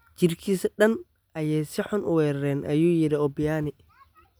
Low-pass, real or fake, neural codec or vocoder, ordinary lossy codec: none; real; none; none